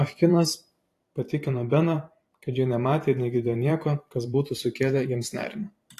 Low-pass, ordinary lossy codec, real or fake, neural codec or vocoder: 14.4 kHz; AAC, 48 kbps; fake; vocoder, 44.1 kHz, 128 mel bands every 512 samples, BigVGAN v2